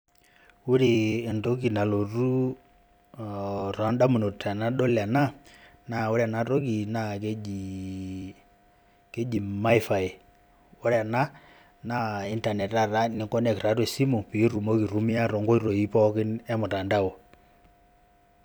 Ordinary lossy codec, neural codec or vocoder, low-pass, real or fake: none; vocoder, 44.1 kHz, 128 mel bands every 256 samples, BigVGAN v2; none; fake